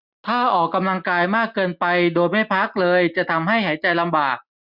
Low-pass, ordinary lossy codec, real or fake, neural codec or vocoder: 5.4 kHz; none; real; none